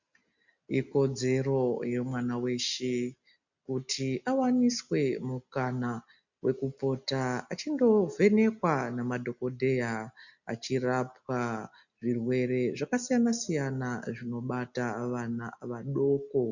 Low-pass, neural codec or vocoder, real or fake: 7.2 kHz; none; real